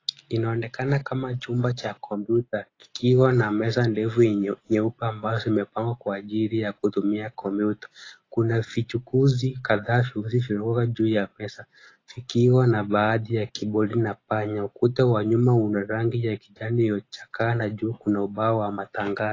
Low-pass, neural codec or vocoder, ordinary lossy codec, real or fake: 7.2 kHz; none; AAC, 32 kbps; real